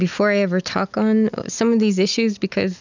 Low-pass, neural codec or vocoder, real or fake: 7.2 kHz; none; real